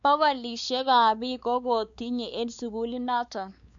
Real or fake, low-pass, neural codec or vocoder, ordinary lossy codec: fake; 7.2 kHz; codec, 16 kHz, 4 kbps, X-Codec, WavLM features, trained on Multilingual LibriSpeech; none